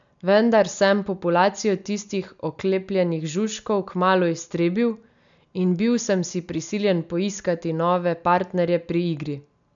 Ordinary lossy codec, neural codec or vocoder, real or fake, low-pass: none; none; real; 7.2 kHz